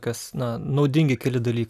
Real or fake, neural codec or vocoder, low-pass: real; none; 14.4 kHz